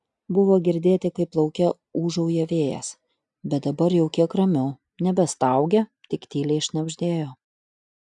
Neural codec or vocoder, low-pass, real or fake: none; 10.8 kHz; real